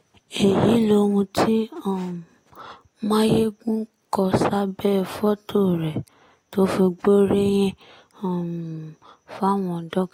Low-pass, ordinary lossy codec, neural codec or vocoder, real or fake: 19.8 kHz; AAC, 48 kbps; none; real